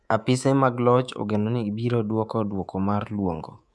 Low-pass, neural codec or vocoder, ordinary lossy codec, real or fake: none; codec, 24 kHz, 3.1 kbps, DualCodec; none; fake